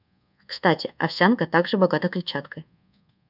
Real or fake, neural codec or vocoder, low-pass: fake; codec, 24 kHz, 1.2 kbps, DualCodec; 5.4 kHz